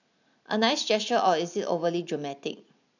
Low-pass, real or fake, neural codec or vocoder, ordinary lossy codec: 7.2 kHz; real; none; none